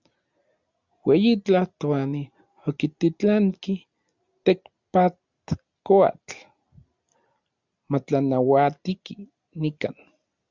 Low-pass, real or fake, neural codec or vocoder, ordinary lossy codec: 7.2 kHz; real; none; Opus, 64 kbps